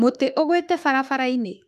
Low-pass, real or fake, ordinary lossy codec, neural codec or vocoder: 14.4 kHz; fake; none; autoencoder, 48 kHz, 32 numbers a frame, DAC-VAE, trained on Japanese speech